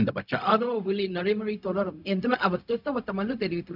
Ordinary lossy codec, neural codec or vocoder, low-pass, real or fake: none; codec, 16 kHz, 0.4 kbps, LongCat-Audio-Codec; 5.4 kHz; fake